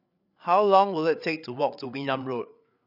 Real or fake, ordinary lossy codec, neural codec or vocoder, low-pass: fake; none; codec, 16 kHz, 8 kbps, FreqCodec, larger model; 5.4 kHz